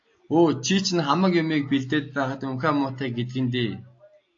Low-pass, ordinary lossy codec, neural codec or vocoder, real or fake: 7.2 kHz; AAC, 48 kbps; none; real